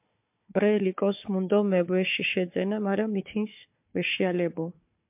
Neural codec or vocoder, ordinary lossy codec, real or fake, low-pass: codec, 16 kHz, 4 kbps, FunCodec, trained on Chinese and English, 50 frames a second; MP3, 32 kbps; fake; 3.6 kHz